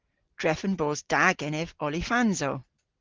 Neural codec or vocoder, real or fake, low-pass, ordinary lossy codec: none; real; 7.2 kHz; Opus, 16 kbps